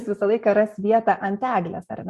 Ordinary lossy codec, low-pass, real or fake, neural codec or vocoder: Opus, 64 kbps; 14.4 kHz; real; none